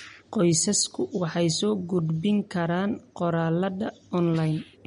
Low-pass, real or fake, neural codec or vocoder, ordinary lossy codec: 19.8 kHz; real; none; MP3, 48 kbps